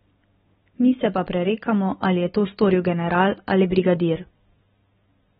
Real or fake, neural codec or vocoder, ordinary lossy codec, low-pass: real; none; AAC, 16 kbps; 14.4 kHz